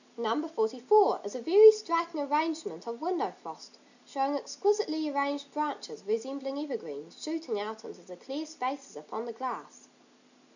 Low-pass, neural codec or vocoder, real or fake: 7.2 kHz; none; real